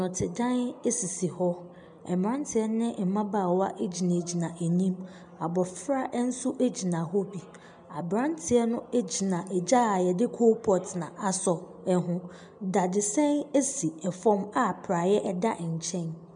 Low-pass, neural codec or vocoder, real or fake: 9.9 kHz; none; real